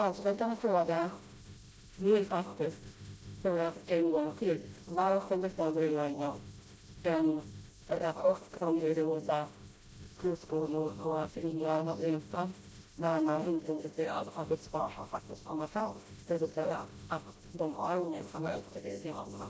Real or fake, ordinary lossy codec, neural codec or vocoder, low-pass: fake; none; codec, 16 kHz, 0.5 kbps, FreqCodec, smaller model; none